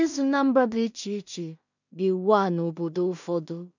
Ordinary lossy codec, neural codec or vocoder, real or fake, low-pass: none; codec, 16 kHz in and 24 kHz out, 0.4 kbps, LongCat-Audio-Codec, two codebook decoder; fake; 7.2 kHz